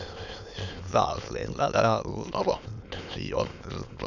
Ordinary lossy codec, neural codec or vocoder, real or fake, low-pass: none; autoencoder, 22.05 kHz, a latent of 192 numbers a frame, VITS, trained on many speakers; fake; 7.2 kHz